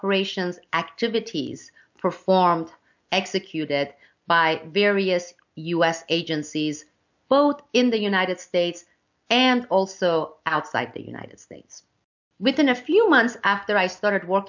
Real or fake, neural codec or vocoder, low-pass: real; none; 7.2 kHz